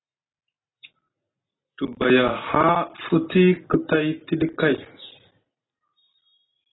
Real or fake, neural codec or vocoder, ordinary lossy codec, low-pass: real; none; AAC, 16 kbps; 7.2 kHz